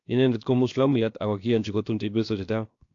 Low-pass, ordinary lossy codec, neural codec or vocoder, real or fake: 7.2 kHz; AAC, 48 kbps; codec, 16 kHz, 0.7 kbps, FocalCodec; fake